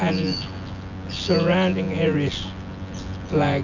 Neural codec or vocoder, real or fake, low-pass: vocoder, 24 kHz, 100 mel bands, Vocos; fake; 7.2 kHz